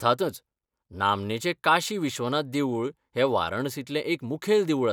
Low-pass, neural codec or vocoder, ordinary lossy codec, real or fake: 19.8 kHz; none; none; real